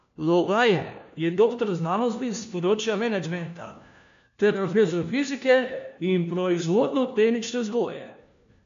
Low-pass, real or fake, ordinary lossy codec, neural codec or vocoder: 7.2 kHz; fake; MP3, 64 kbps; codec, 16 kHz, 1 kbps, FunCodec, trained on LibriTTS, 50 frames a second